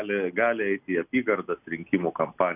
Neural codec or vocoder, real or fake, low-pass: none; real; 3.6 kHz